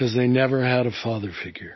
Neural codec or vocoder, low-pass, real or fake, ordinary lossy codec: none; 7.2 kHz; real; MP3, 24 kbps